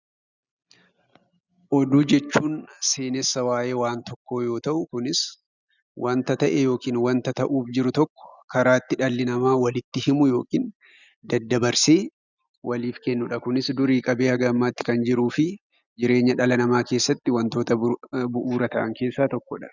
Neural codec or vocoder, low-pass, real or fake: none; 7.2 kHz; real